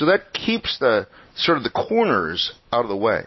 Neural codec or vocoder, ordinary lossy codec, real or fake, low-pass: none; MP3, 24 kbps; real; 7.2 kHz